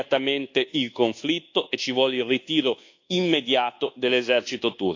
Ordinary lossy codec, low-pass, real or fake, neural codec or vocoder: AAC, 48 kbps; 7.2 kHz; fake; codec, 24 kHz, 1.2 kbps, DualCodec